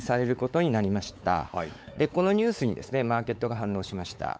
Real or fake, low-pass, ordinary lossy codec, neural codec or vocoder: fake; none; none; codec, 16 kHz, 4 kbps, X-Codec, WavLM features, trained on Multilingual LibriSpeech